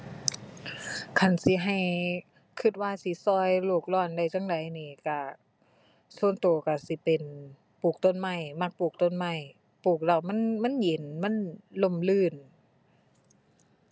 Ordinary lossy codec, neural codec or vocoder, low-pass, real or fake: none; none; none; real